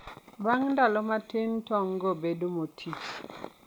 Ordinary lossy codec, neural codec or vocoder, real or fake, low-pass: none; none; real; none